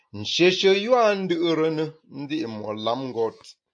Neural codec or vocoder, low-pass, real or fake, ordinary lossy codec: none; 9.9 kHz; real; AAC, 64 kbps